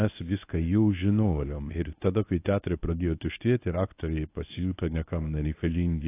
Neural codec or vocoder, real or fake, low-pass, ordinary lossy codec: codec, 24 kHz, 0.9 kbps, WavTokenizer, medium speech release version 1; fake; 3.6 kHz; AAC, 24 kbps